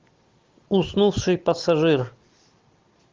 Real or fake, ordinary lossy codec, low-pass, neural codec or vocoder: real; Opus, 32 kbps; 7.2 kHz; none